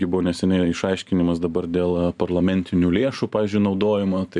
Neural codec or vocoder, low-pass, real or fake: none; 10.8 kHz; real